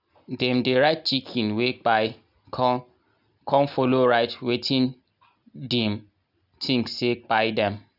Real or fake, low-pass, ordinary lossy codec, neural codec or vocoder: real; 5.4 kHz; none; none